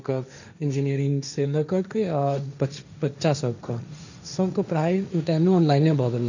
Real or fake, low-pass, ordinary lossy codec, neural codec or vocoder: fake; 7.2 kHz; none; codec, 16 kHz, 1.1 kbps, Voila-Tokenizer